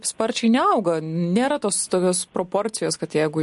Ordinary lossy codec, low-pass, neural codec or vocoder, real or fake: MP3, 48 kbps; 14.4 kHz; none; real